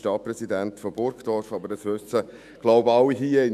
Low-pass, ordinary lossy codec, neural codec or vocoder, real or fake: 14.4 kHz; none; autoencoder, 48 kHz, 128 numbers a frame, DAC-VAE, trained on Japanese speech; fake